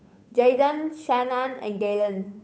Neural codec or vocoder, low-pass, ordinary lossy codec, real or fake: codec, 16 kHz, 8 kbps, FunCodec, trained on Chinese and English, 25 frames a second; none; none; fake